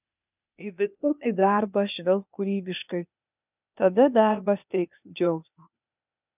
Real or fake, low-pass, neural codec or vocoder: fake; 3.6 kHz; codec, 16 kHz, 0.8 kbps, ZipCodec